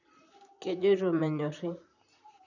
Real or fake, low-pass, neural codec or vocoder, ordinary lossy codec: fake; 7.2 kHz; vocoder, 44.1 kHz, 128 mel bands, Pupu-Vocoder; none